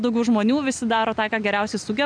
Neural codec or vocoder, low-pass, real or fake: none; 9.9 kHz; real